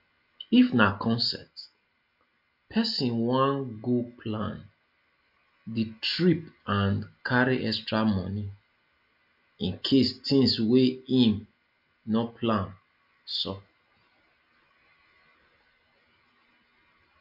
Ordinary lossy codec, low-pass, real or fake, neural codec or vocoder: MP3, 48 kbps; 5.4 kHz; real; none